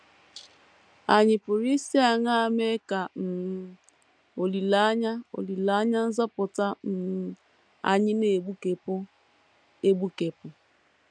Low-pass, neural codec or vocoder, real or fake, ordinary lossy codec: 9.9 kHz; none; real; none